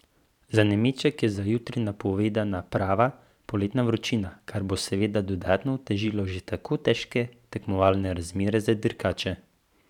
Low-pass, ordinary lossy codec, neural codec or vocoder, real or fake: 19.8 kHz; none; vocoder, 44.1 kHz, 128 mel bands every 512 samples, BigVGAN v2; fake